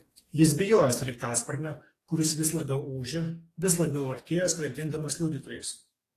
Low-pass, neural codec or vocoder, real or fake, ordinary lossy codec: 14.4 kHz; codec, 44.1 kHz, 2.6 kbps, DAC; fake; AAC, 48 kbps